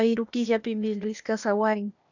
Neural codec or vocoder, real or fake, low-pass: codec, 16 kHz, 0.8 kbps, ZipCodec; fake; 7.2 kHz